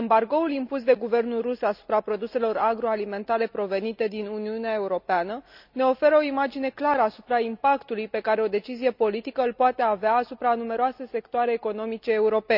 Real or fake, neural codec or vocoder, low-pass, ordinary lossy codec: real; none; 5.4 kHz; none